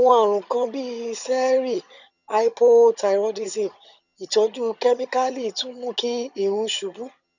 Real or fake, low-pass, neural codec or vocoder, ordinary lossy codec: fake; 7.2 kHz; vocoder, 22.05 kHz, 80 mel bands, HiFi-GAN; none